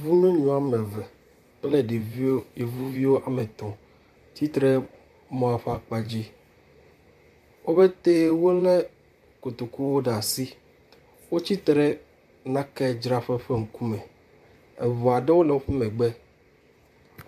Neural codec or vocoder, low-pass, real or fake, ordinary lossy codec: vocoder, 44.1 kHz, 128 mel bands, Pupu-Vocoder; 14.4 kHz; fake; MP3, 96 kbps